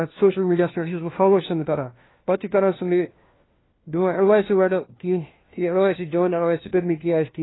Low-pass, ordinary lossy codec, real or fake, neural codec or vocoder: 7.2 kHz; AAC, 16 kbps; fake; codec, 16 kHz, 1 kbps, FunCodec, trained on LibriTTS, 50 frames a second